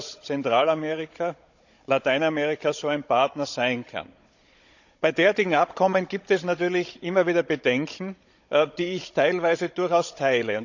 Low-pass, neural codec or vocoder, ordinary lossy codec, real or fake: 7.2 kHz; codec, 16 kHz, 16 kbps, FunCodec, trained on Chinese and English, 50 frames a second; none; fake